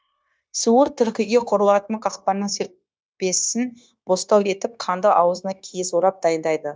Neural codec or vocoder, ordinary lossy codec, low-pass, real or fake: codec, 16 kHz, 0.9 kbps, LongCat-Audio-Codec; none; none; fake